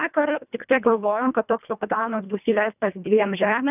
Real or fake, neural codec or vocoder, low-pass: fake; codec, 24 kHz, 1.5 kbps, HILCodec; 3.6 kHz